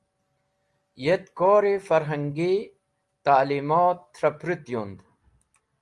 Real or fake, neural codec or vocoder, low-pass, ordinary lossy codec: real; none; 10.8 kHz; Opus, 32 kbps